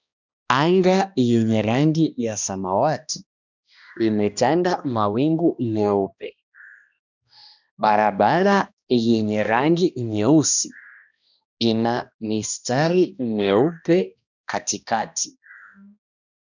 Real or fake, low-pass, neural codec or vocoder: fake; 7.2 kHz; codec, 16 kHz, 1 kbps, X-Codec, HuBERT features, trained on balanced general audio